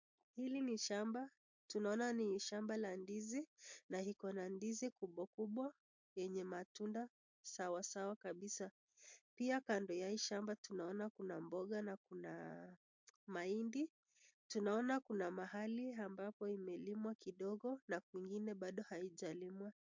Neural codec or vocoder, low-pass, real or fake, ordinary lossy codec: none; 7.2 kHz; real; MP3, 48 kbps